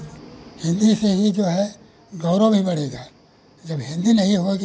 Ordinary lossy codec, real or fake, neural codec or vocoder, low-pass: none; real; none; none